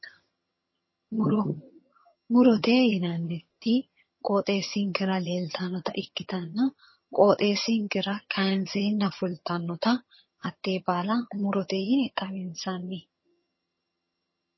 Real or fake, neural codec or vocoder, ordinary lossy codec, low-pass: fake; vocoder, 22.05 kHz, 80 mel bands, HiFi-GAN; MP3, 24 kbps; 7.2 kHz